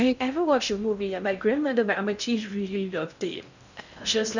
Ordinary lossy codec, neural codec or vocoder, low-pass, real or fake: none; codec, 16 kHz in and 24 kHz out, 0.6 kbps, FocalCodec, streaming, 2048 codes; 7.2 kHz; fake